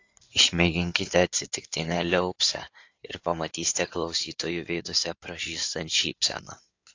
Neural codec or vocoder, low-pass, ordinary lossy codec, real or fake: codec, 16 kHz in and 24 kHz out, 2.2 kbps, FireRedTTS-2 codec; 7.2 kHz; AAC, 48 kbps; fake